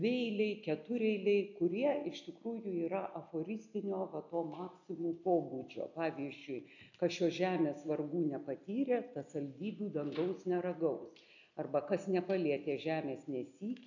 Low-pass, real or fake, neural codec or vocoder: 7.2 kHz; real; none